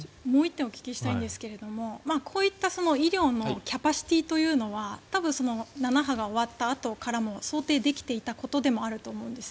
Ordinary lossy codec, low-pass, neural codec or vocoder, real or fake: none; none; none; real